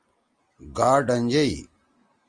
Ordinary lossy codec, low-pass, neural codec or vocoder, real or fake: Opus, 32 kbps; 9.9 kHz; none; real